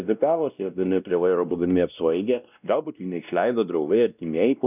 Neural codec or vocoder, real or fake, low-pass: codec, 16 kHz, 1 kbps, X-Codec, WavLM features, trained on Multilingual LibriSpeech; fake; 3.6 kHz